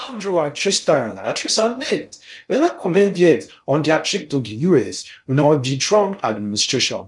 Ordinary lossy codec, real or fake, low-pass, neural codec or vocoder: none; fake; 10.8 kHz; codec, 16 kHz in and 24 kHz out, 0.6 kbps, FocalCodec, streaming, 2048 codes